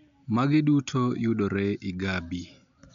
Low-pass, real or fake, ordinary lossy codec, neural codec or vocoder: 7.2 kHz; real; none; none